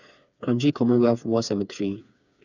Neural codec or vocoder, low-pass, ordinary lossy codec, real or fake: codec, 16 kHz, 4 kbps, FreqCodec, smaller model; 7.2 kHz; none; fake